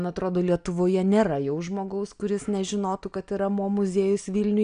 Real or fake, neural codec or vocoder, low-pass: real; none; 9.9 kHz